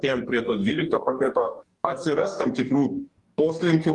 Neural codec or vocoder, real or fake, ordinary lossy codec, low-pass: codec, 44.1 kHz, 2.6 kbps, DAC; fake; Opus, 24 kbps; 10.8 kHz